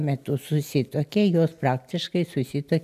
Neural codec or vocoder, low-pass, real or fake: none; 14.4 kHz; real